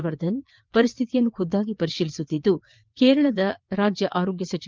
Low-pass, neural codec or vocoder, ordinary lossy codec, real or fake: 7.2 kHz; vocoder, 22.05 kHz, 80 mel bands, WaveNeXt; Opus, 32 kbps; fake